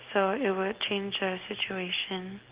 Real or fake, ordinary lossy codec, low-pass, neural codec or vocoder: real; Opus, 16 kbps; 3.6 kHz; none